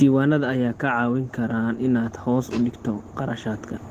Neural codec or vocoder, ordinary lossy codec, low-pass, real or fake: none; Opus, 16 kbps; 14.4 kHz; real